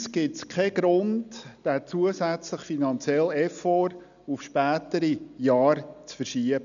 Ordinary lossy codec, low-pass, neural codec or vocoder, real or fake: none; 7.2 kHz; none; real